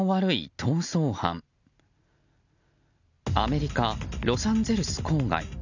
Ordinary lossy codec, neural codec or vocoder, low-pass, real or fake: none; none; 7.2 kHz; real